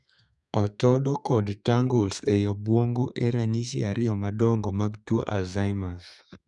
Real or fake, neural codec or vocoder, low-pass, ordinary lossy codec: fake; codec, 32 kHz, 1.9 kbps, SNAC; 10.8 kHz; none